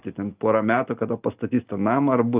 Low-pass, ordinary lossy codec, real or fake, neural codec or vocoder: 3.6 kHz; Opus, 24 kbps; real; none